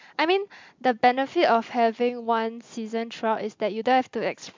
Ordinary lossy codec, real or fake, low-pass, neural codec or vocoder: MP3, 64 kbps; real; 7.2 kHz; none